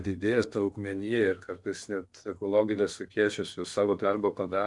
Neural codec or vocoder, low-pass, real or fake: codec, 16 kHz in and 24 kHz out, 0.8 kbps, FocalCodec, streaming, 65536 codes; 10.8 kHz; fake